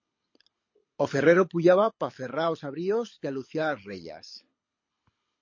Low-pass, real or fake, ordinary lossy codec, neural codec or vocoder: 7.2 kHz; fake; MP3, 32 kbps; codec, 24 kHz, 6 kbps, HILCodec